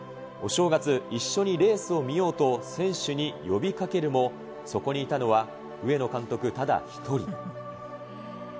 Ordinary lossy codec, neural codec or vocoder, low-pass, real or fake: none; none; none; real